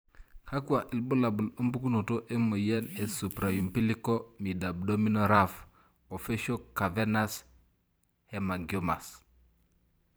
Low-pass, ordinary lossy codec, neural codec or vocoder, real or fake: none; none; vocoder, 44.1 kHz, 128 mel bands every 512 samples, BigVGAN v2; fake